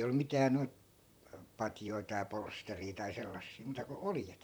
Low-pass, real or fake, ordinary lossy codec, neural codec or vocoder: none; fake; none; vocoder, 44.1 kHz, 128 mel bands, Pupu-Vocoder